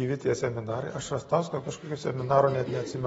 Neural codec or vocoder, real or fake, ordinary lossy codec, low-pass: none; real; AAC, 24 kbps; 10.8 kHz